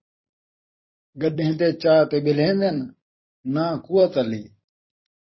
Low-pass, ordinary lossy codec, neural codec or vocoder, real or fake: 7.2 kHz; MP3, 24 kbps; vocoder, 44.1 kHz, 128 mel bands every 256 samples, BigVGAN v2; fake